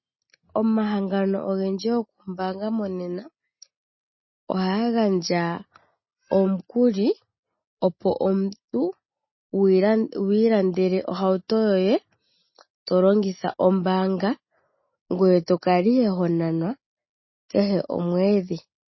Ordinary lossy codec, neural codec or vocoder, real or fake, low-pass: MP3, 24 kbps; none; real; 7.2 kHz